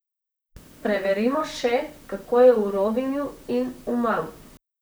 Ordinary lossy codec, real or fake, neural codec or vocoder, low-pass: none; fake; vocoder, 44.1 kHz, 128 mel bands, Pupu-Vocoder; none